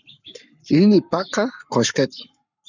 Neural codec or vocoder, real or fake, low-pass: codec, 44.1 kHz, 7.8 kbps, Pupu-Codec; fake; 7.2 kHz